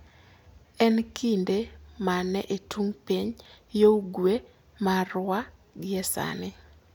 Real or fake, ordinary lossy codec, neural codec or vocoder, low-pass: real; none; none; none